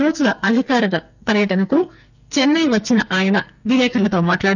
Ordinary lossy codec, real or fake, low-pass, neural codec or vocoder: none; fake; 7.2 kHz; codec, 44.1 kHz, 2.6 kbps, SNAC